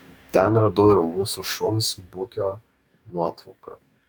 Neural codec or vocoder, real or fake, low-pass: codec, 44.1 kHz, 2.6 kbps, DAC; fake; 19.8 kHz